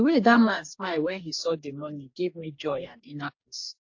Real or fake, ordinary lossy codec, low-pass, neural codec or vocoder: fake; AAC, 48 kbps; 7.2 kHz; codec, 44.1 kHz, 2.6 kbps, DAC